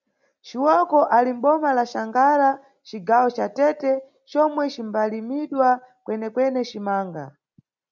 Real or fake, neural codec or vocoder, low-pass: real; none; 7.2 kHz